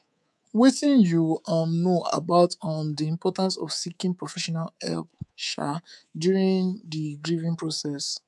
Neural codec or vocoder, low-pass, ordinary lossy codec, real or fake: codec, 24 kHz, 3.1 kbps, DualCodec; none; none; fake